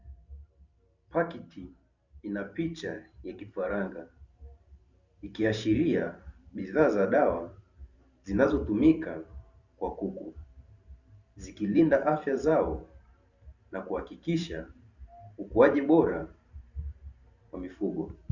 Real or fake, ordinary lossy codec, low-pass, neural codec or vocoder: fake; Opus, 64 kbps; 7.2 kHz; vocoder, 44.1 kHz, 128 mel bands every 512 samples, BigVGAN v2